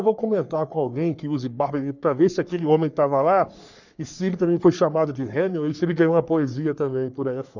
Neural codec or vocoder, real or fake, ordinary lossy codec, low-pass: codec, 44.1 kHz, 3.4 kbps, Pupu-Codec; fake; none; 7.2 kHz